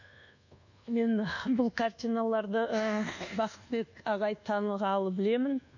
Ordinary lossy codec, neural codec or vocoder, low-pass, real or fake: none; codec, 24 kHz, 1.2 kbps, DualCodec; 7.2 kHz; fake